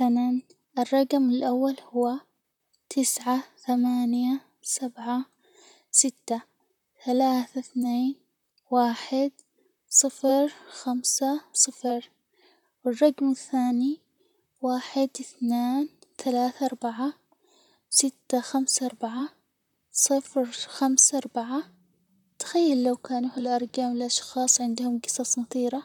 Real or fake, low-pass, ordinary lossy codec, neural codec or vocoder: fake; 19.8 kHz; none; vocoder, 44.1 kHz, 128 mel bands, Pupu-Vocoder